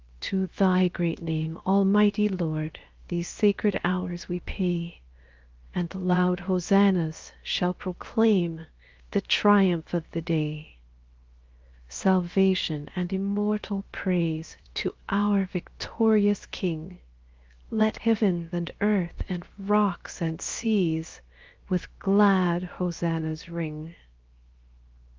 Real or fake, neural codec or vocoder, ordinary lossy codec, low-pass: fake; codec, 16 kHz, 0.7 kbps, FocalCodec; Opus, 32 kbps; 7.2 kHz